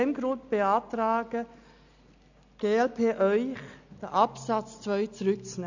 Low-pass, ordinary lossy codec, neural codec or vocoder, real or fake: 7.2 kHz; none; none; real